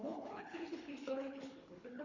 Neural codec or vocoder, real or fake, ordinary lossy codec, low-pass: codec, 24 kHz, 3 kbps, HILCodec; fake; MP3, 64 kbps; 7.2 kHz